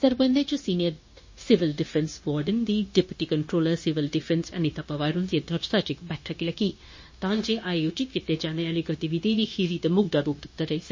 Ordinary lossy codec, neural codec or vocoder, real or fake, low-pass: MP3, 32 kbps; codec, 16 kHz, 0.9 kbps, LongCat-Audio-Codec; fake; 7.2 kHz